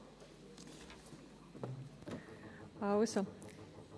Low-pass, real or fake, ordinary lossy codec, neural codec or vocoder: none; real; none; none